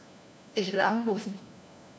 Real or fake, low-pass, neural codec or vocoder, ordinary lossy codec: fake; none; codec, 16 kHz, 1 kbps, FunCodec, trained on LibriTTS, 50 frames a second; none